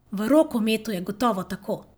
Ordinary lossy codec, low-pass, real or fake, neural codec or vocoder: none; none; real; none